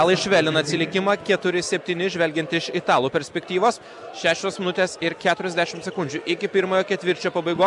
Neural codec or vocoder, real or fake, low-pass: none; real; 10.8 kHz